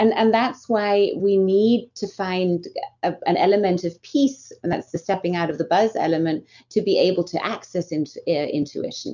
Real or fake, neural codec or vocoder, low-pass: real; none; 7.2 kHz